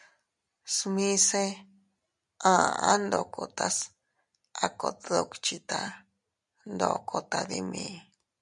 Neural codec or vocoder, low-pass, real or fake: none; 10.8 kHz; real